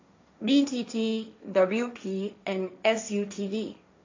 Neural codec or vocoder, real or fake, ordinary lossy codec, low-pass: codec, 16 kHz, 1.1 kbps, Voila-Tokenizer; fake; AAC, 48 kbps; 7.2 kHz